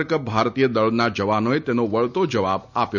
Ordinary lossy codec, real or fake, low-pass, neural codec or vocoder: none; real; 7.2 kHz; none